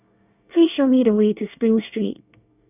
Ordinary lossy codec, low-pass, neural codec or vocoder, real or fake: none; 3.6 kHz; codec, 24 kHz, 1 kbps, SNAC; fake